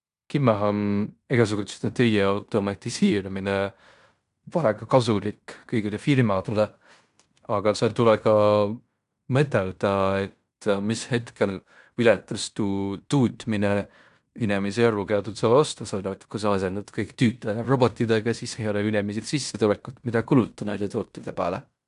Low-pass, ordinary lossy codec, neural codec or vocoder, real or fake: 10.8 kHz; none; codec, 16 kHz in and 24 kHz out, 0.9 kbps, LongCat-Audio-Codec, fine tuned four codebook decoder; fake